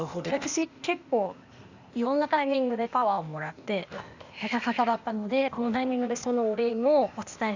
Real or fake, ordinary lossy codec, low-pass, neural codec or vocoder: fake; Opus, 64 kbps; 7.2 kHz; codec, 16 kHz, 0.8 kbps, ZipCodec